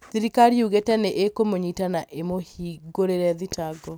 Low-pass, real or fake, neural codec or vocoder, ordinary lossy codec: none; real; none; none